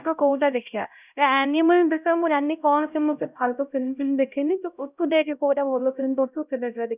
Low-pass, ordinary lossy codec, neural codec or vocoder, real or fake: 3.6 kHz; none; codec, 16 kHz, 0.5 kbps, X-Codec, HuBERT features, trained on LibriSpeech; fake